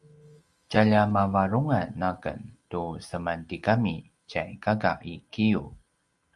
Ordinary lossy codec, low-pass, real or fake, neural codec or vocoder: Opus, 32 kbps; 10.8 kHz; real; none